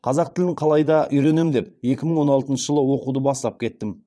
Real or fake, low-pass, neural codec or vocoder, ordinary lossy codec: fake; none; vocoder, 22.05 kHz, 80 mel bands, Vocos; none